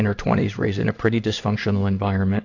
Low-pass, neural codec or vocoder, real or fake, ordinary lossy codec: 7.2 kHz; codec, 16 kHz in and 24 kHz out, 1 kbps, XY-Tokenizer; fake; AAC, 48 kbps